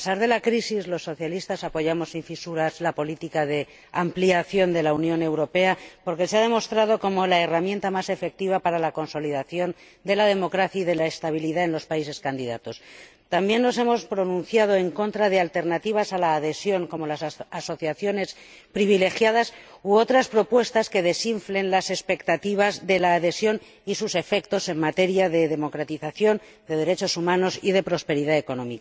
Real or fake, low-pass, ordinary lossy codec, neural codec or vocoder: real; none; none; none